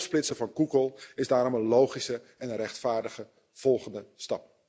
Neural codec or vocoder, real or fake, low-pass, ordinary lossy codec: none; real; none; none